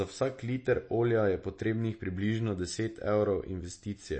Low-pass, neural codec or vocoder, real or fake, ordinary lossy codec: 9.9 kHz; none; real; MP3, 32 kbps